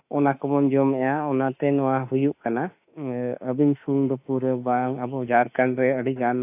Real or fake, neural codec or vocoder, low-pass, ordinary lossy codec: fake; autoencoder, 48 kHz, 32 numbers a frame, DAC-VAE, trained on Japanese speech; 3.6 kHz; AAC, 32 kbps